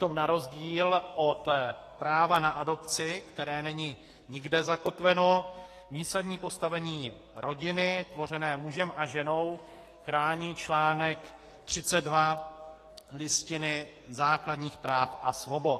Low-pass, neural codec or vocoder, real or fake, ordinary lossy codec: 14.4 kHz; codec, 44.1 kHz, 2.6 kbps, SNAC; fake; AAC, 48 kbps